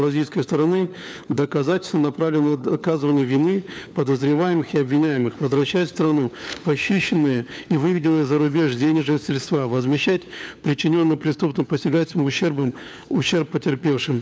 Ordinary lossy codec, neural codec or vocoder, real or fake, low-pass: none; codec, 16 kHz, 4 kbps, FunCodec, trained on LibriTTS, 50 frames a second; fake; none